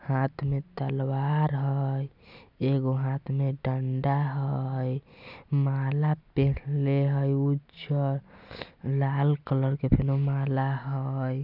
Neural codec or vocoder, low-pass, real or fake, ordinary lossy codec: none; 5.4 kHz; real; none